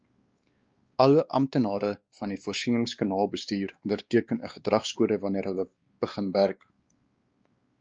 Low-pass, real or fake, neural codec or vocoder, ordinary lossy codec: 7.2 kHz; fake; codec, 16 kHz, 2 kbps, X-Codec, WavLM features, trained on Multilingual LibriSpeech; Opus, 24 kbps